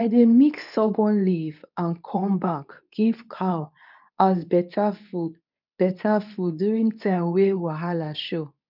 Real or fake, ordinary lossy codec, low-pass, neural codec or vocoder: fake; none; 5.4 kHz; codec, 24 kHz, 0.9 kbps, WavTokenizer, medium speech release version 2